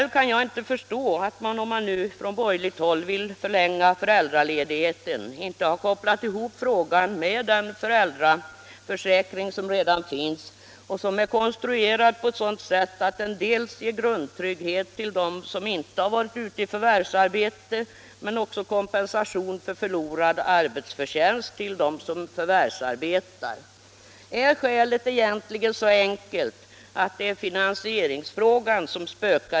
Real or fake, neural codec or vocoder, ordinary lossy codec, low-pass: real; none; none; none